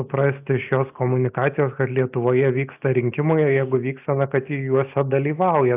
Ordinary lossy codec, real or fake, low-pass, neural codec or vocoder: AAC, 32 kbps; real; 3.6 kHz; none